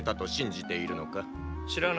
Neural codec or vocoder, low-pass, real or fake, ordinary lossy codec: none; none; real; none